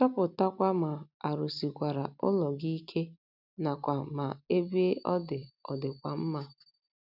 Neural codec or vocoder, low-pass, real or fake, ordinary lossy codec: none; 5.4 kHz; real; none